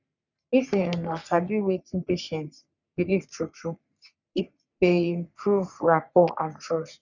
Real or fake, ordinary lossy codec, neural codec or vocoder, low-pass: fake; none; codec, 44.1 kHz, 3.4 kbps, Pupu-Codec; 7.2 kHz